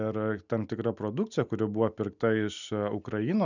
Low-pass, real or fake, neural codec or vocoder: 7.2 kHz; real; none